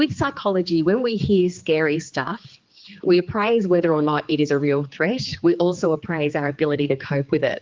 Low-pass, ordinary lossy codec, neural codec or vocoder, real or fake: 7.2 kHz; Opus, 32 kbps; codec, 16 kHz, 4 kbps, X-Codec, HuBERT features, trained on general audio; fake